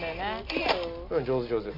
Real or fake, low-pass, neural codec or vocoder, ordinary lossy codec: real; 5.4 kHz; none; MP3, 48 kbps